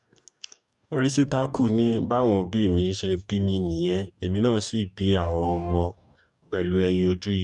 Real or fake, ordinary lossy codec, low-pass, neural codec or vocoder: fake; none; 10.8 kHz; codec, 44.1 kHz, 2.6 kbps, DAC